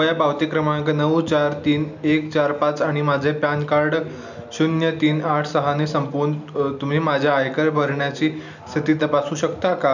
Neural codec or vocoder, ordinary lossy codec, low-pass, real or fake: none; none; 7.2 kHz; real